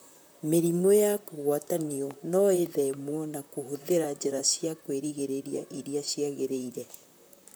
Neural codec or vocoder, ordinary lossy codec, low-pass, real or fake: vocoder, 44.1 kHz, 128 mel bands, Pupu-Vocoder; none; none; fake